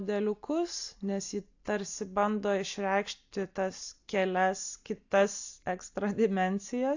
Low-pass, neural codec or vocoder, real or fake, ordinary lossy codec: 7.2 kHz; none; real; AAC, 48 kbps